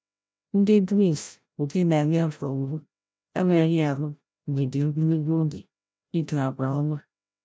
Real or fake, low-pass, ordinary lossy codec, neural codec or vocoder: fake; none; none; codec, 16 kHz, 0.5 kbps, FreqCodec, larger model